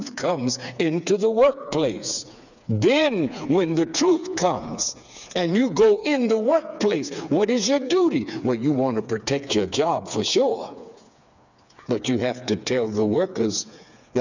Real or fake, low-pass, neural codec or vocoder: fake; 7.2 kHz; codec, 16 kHz, 4 kbps, FreqCodec, smaller model